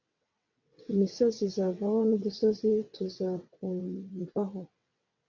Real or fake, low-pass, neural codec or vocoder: fake; 7.2 kHz; vocoder, 22.05 kHz, 80 mel bands, WaveNeXt